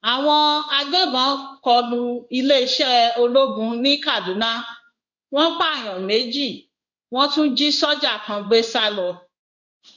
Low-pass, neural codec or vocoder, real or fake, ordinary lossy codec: 7.2 kHz; codec, 16 kHz in and 24 kHz out, 1 kbps, XY-Tokenizer; fake; none